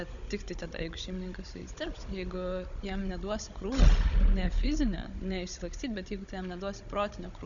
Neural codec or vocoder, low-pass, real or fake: codec, 16 kHz, 16 kbps, FunCodec, trained on Chinese and English, 50 frames a second; 7.2 kHz; fake